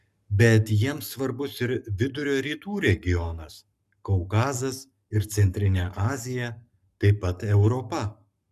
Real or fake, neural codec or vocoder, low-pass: fake; codec, 44.1 kHz, 7.8 kbps, Pupu-Codec; 14.4 kHz